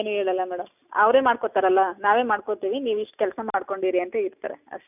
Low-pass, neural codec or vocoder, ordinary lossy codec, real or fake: 3.6 kHz; none; none; real